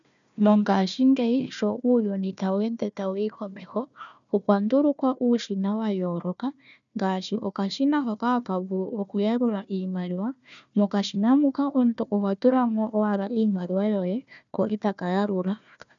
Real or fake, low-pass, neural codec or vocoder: fake; 7.2 kHz; codec, 16 kHz, 1 kbps, FunCodec, trained on Chinese and English, 50 frames a second